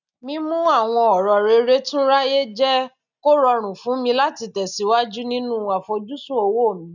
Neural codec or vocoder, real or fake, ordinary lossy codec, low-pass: none; real; none; 7.2 kHz